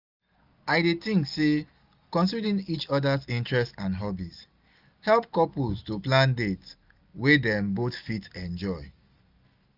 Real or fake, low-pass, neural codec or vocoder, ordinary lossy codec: real; 5.4 kHz; none; none